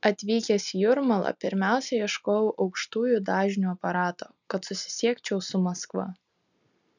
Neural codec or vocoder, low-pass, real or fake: none; 7.2 kHz; real